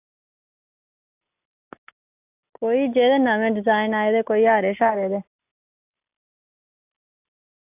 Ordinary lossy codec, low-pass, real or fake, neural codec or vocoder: AAC, 32 kbps; 3.6 kHz; real; none